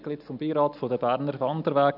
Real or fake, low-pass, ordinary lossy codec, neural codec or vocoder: real; 5.4 kHz; none; none